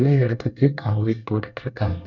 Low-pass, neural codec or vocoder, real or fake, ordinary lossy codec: 7.2 kHz; codec, 24 kHz, 1 kbps, SNAC; fake; none